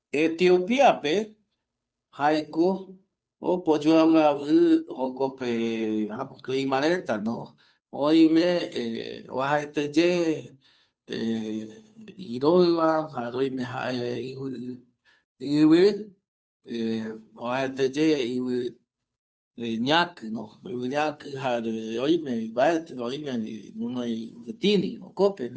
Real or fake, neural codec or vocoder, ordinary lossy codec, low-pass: fake; codec, 16 kHz, 2 kbps, FunCodec, trained on Chinese and English, 25 frames a second; none; none